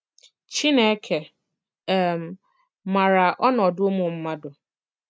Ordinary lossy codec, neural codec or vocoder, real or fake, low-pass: none; none; real; none